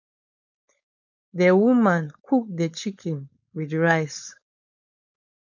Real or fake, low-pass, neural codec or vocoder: fake; 7.2 kHz; codec, 16 kHz, 4.8 kbps, FACodec